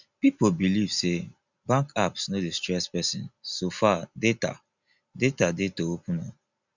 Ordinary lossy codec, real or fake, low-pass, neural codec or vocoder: none; real; 7.2 kHz; none